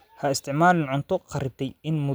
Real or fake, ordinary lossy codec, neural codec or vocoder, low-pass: real; none; none; none